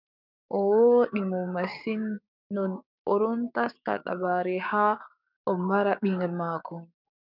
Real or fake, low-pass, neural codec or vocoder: fake; 5.4 kHz; codec, 16 kHz, 6 kbps, DAC